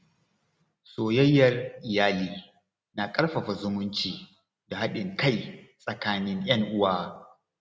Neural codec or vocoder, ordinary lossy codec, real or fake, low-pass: none; none; real; none